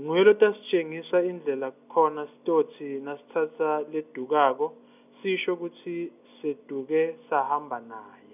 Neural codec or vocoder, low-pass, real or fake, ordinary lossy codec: none; 3.6 kHz; real; none